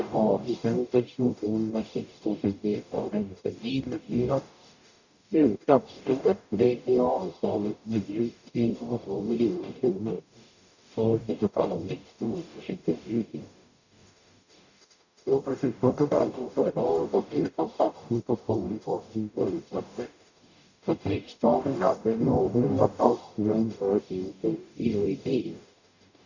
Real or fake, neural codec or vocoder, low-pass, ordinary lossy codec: fake; codec, 44.1 kHz, 0.9 kbps, DAC; 7.2 kHz; none